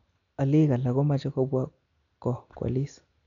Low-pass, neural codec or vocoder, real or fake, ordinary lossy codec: 7.2 kHz; none; real; none